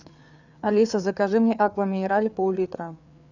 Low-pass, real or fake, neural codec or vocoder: 7.2 kHz; fake; codec, 16 kHz, 4 kbps, FreqCodec, larger model